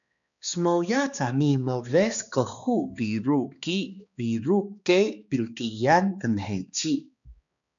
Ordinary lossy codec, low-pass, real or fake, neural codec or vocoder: MP3, 96 kbps; 7.2 kHz; fake; codec, 16 kHz, 2 kbps, X-Codec, HuBERT features, trained on balanced general audio